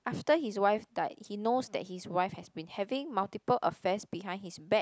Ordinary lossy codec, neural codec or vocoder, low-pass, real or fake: none; none; none; real